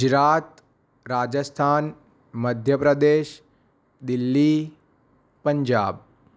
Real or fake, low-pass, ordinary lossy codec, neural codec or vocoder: real; none; none; none